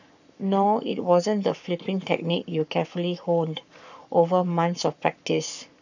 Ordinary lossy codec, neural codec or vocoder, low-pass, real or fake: none; codec, 44.1 kHz, 7.8 kbps, Pupu-Codec; 7.2 kHz; fake